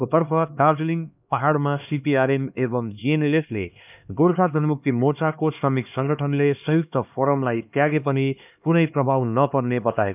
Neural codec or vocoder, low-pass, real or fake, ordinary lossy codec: codec, 16 kHz, 2 kbps, X-Codec, HuBERT features, trained on LibriSpeech; 3.6 kHz; fake; none